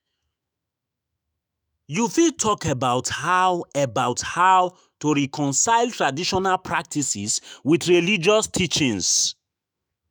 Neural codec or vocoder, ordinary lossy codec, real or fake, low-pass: autoencoder, 48 kHz, 128 numbers a frame, DAC-VAE, trained on Japanese speech; none; fake; none